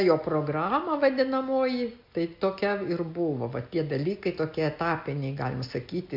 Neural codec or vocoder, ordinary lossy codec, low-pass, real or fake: none; MP3, 48 kbps; 5.4 kHz; real